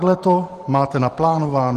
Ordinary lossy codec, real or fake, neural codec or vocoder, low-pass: Opus, 24 kbps; fake; vocoder, 44.1 kHz, 128 mel bands every 512 samples, BigVGAN v2; 14.4 kHz